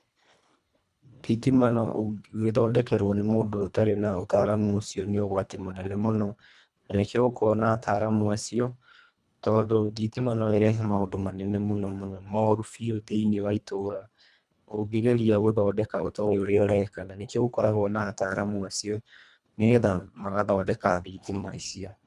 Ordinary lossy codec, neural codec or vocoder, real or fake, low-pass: none; codec, 24 kHz, 1.5 kbps, HILCodec; fake; none